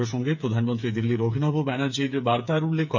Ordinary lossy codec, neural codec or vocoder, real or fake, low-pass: none; codec, 16 kHz, 8 kbps, FreqCodec, smaller model; fake; 7.2 kHz